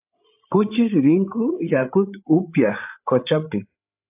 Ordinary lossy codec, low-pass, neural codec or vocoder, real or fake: AAC, 32 kbps; 3.6 kHz; codec, 16 kHz, 8 kbps, FreqCodec, larger model; fake